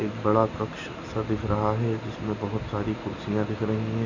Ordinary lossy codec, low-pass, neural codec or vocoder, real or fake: none; 7.2 kHz; none; real